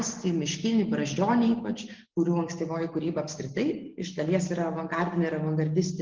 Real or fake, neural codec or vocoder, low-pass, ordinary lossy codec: real; none; 7.2 kHz; Opus, 16 kbps